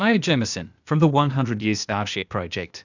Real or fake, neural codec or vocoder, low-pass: fake; codec, 16 kHz, 0.8 kbps, ZipCodec; 7.2 kHz